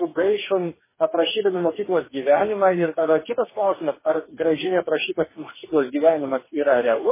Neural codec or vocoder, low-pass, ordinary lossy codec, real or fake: codec, 44.1 kHz, 2.6 kbps, DAC; 3.6 kHz; MP3, 16 kbps; fake